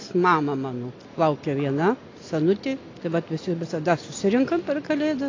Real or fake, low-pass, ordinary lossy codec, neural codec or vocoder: real; 7.2 kHz; AAC, 32 kbps; none